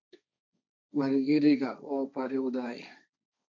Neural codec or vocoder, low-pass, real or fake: codec, 16 kHz, 1.1 kbps, Voila-Tokenizer; 7.2 kHz; fake